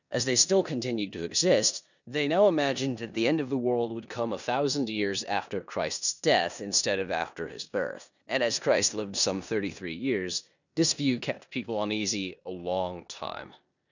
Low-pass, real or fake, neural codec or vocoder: 7.2 kHz; fake; codec, 16 kHz in and 24 kHz out, 0.9 kbps, LongCat-Audio-Codec, four codebook decoder